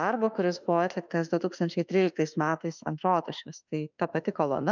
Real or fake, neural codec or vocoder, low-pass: fake; autoencoder, 48 kHz, 32 numbers a frame, DAC-VAE, trained on Japanese speech; 7.2 kHz